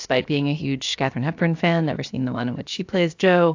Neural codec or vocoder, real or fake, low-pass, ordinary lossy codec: codec, 16 kHz, about 1 kbps, DyCAST, with the encoder's durations; fake; 7.2 kHz; AAC, 48 kbps